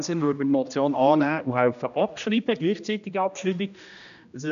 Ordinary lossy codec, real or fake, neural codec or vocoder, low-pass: none; fake; codec, 16 kHz, 1 kbps, X-Codec, HuBERT features, trained on general audio; 7.2 kHz